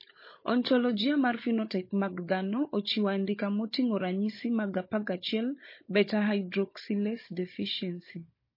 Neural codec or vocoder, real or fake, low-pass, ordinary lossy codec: codec, 16 kHz, 16 kbps, FunCodec, trained on Chinese and English, 50 frames a second; fake; 5.4 kHz; MP3, 24 kbps